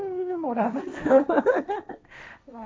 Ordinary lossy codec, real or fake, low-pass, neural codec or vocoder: none; fake; none; codec, 16 kHz, 1.1 kbps, Voila-Tokenizer